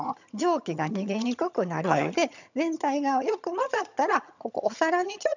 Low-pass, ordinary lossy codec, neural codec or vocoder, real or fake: 7.2 kHz; none; vocoder, 22.05 kHz, 80 mel bands, HiFi-GAN; fake